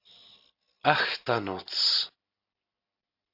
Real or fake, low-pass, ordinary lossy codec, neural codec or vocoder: real; 5.4 kHz; AAC, 48 kbps; none